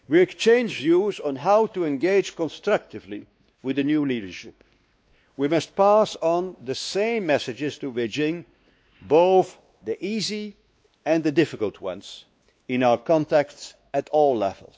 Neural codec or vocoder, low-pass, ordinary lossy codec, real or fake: codec, 16 kHz, 2 kbps, X-Codec, WavLM features, trained on Multilingual LibriSpeech; none; none; fake